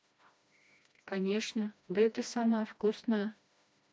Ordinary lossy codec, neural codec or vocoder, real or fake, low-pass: none; codec, 16 kHz, 1 kbps, FreqCodec, smaller model; fake; none